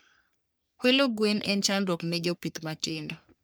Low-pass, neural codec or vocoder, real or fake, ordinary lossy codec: none; codec, 44.1 kHz, 3.4 kbps, Pupu-Codec; fake; none